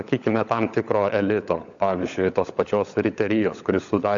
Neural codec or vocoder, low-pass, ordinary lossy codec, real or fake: codec, 16 kHz, 16 kbps, FunCodec, trained on LibriTTS, 50 frames a second; 7.2 kHz; MP3, 64 kbps; fake